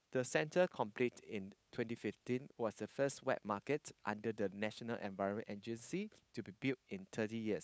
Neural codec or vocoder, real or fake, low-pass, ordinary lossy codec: codec, 16 kHz, 8 kbps, FunCodec, trained on Chinese and English, 25 frames a second; fake; none; none